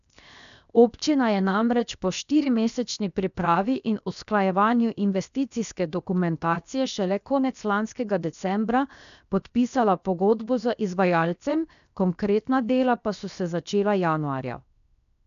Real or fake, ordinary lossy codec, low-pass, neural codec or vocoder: fake; none; 7.2 kHz; codec, 16 kHz, 0.7 kbps, FocalCodec